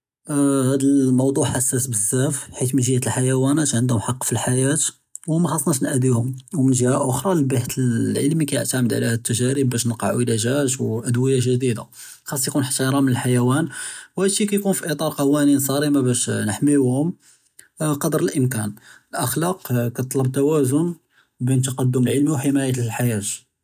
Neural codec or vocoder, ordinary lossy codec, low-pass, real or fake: none; none; 14.4 kHz; real